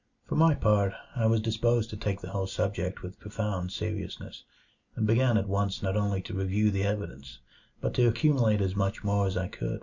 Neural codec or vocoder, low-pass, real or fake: none; 7.2 kHz; real